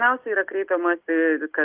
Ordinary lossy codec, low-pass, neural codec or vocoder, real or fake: Opus, 32 kbps; 3.6 kHz; none; real